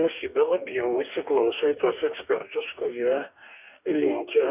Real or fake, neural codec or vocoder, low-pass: fake; codec, 44.1 kHz, 2.6 kbps, DAC; 3.6 kHz